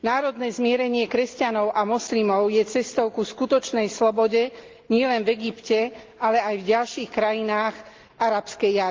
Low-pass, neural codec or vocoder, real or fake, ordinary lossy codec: 7.2 kHz; none; real; Opus, 32 kbps